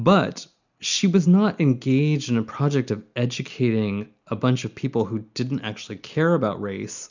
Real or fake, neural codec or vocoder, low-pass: real; none; 7.2 kHz